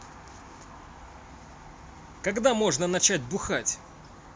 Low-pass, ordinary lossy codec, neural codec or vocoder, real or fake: none; none; none; real